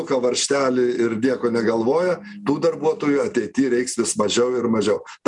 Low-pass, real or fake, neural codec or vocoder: 10.8 kHz; real; none